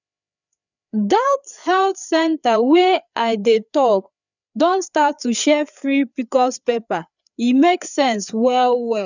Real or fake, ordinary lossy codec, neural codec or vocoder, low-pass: fake; none; codec, 16 kHz, 4 kbps, FreqCodec, larger model; 7.2 kHz